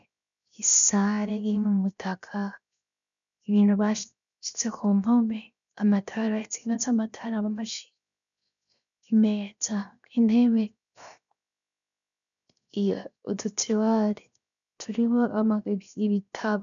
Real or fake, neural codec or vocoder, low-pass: fake; codec, 16 kHz, 0.7 kbps, FocalCodec; 7.2 kHz